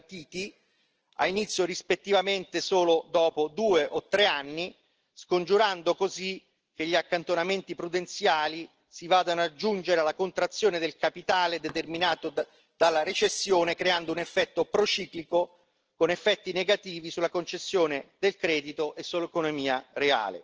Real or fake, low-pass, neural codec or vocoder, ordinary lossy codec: real; 7.2 kHz; none; Opus, 16 kbps